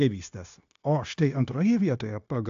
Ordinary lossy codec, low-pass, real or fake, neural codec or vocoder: AAC, 64 kbps; 7.2 kHz; fake; codec, 16 kHz, 0.9 kbps, LongCat-Audio-Codec